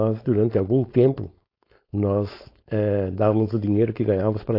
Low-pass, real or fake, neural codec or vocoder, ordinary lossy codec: 5.4 kHz; fake; codec, 16 kHz, 4.8 kbps, FACodec; AAC, 32 kbps